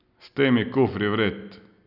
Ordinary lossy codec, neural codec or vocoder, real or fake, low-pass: none; none; real; 5.4 kHz